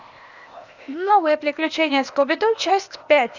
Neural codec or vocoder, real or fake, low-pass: codec, 16 kHz, 0.8 kbps, ZipCodec; fake; 7.2 kHz